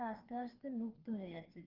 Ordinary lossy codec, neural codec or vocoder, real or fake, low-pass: Opus, 16 kbps; codec, 16 kHz, 2 kbps, FreqCodec, larger model; fake; 5.4 kHz